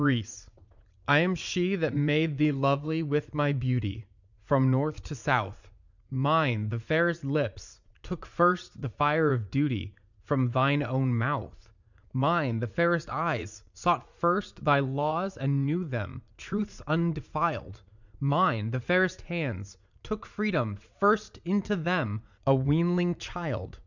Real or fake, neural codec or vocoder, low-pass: fake; vocoder, 44.1 kHz, 80 mel bands, Vocos; 7.2 kHz